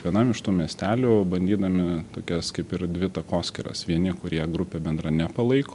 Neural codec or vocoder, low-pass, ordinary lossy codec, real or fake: none; 10.8 kHz; MP3, 64 kbps; real